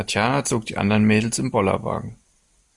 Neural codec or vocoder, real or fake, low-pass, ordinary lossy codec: none; real; 10.8 kHz; Opus, 64 kbps